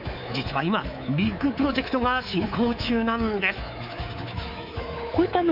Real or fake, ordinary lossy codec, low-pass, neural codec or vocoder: fake; none; 5.4 kHz; codec, 24 kHz, 3.1 kbps, DualCodec